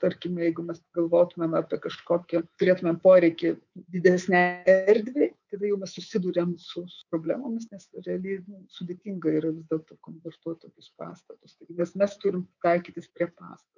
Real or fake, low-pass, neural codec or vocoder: fake; 7.2 kHz; codec, 24 kHz, 3.1 kbps, DualCodec